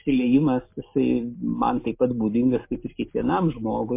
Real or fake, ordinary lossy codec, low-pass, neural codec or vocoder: real; MP3, 24 kbps; 3.6 kHz; none